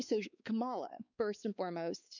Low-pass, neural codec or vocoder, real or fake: 7.2 kHz; codec, 16 kHz, 4 kbps, X-Codec, WavLM features, trained on Multilingual LibriSpeech; fake